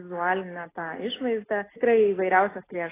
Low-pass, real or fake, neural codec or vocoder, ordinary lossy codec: 3.6 kHz; real; none; AAC, 16 kbps